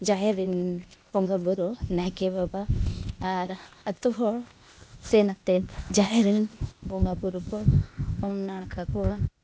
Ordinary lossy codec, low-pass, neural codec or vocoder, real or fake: none; none; codec, 16 kHz, 0.8 kbps, ZipCodec; fake